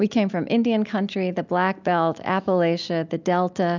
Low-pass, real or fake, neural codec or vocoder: 7.2 kHz; real; none